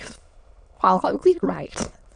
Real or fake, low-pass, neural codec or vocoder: fake; 9.9 kHz; autoencoder, 22.05 kHz, a latent of 192 numbers a frame, VITS, trained on many speakers